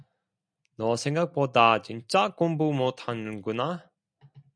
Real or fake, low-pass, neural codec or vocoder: real; 9.9 kHz; none